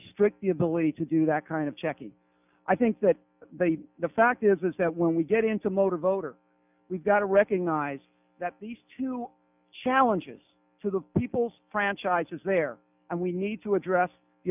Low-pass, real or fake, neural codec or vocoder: 3.6 kHz; real; none